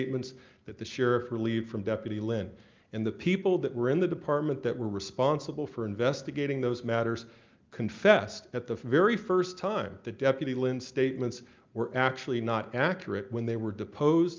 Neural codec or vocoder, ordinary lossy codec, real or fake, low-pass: none; Opus, 24 kbps; real; 7.2 kHz